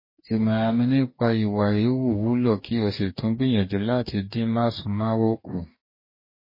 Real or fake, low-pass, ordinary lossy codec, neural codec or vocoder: fake; 5.4 kHz; MP3, 24 kbps; codec, 44.1 kHz, 2.6 kbps, DAC